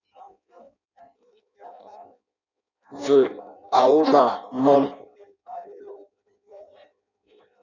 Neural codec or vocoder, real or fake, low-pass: codec, 16 kHz in and 24 kHz out, 0.6 kbps, FireRedTTS-2 codec; fake; 7.2 kHz